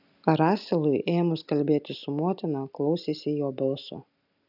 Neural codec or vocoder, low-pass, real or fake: none; 5.4 kHz; real